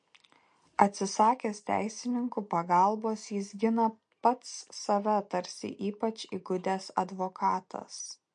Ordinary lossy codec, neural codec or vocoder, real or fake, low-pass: MP3, 48 kbps; none; real; 9.9 kHz